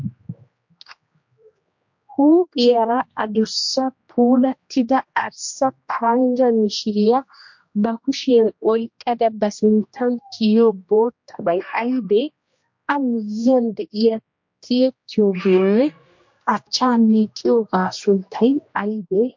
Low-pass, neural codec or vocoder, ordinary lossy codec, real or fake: 7.2 kHz; codec, 16 kHz, 1 kbps, X-Codec, HuBERT features, trained on general audio; MP3, 48 kbps; fake